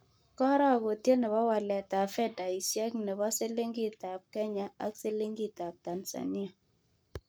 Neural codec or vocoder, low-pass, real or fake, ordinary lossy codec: codec, 44.1 kHz, 7.8 kbps, Pupu-Codec; none; fake; none